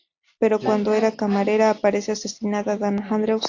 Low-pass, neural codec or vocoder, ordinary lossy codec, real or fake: 7.2 kHz; none; AAC, 48 kbps; real